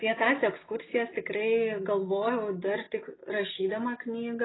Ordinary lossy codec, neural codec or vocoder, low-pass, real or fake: AAC, 16 kbps; none; 7.2 kHz; real